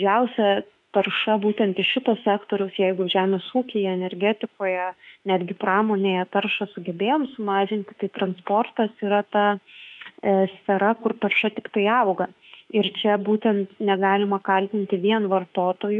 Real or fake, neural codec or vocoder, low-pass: fake; autoencoder, 48 kHz, 32 numbers a frame, DAC-VAE, trained on Japanese speech; 10.8 kHz